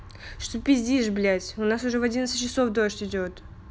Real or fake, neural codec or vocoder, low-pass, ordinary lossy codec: real; none; none; none